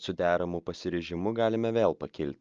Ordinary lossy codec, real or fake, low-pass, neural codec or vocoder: Opus, 24 kbps; real; 7.2 kHz; none